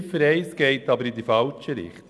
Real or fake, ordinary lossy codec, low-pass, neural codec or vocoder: real; none; none; none